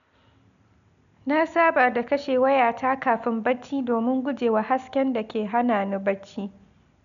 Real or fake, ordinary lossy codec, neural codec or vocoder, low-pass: real; none; none; 7.2 kHz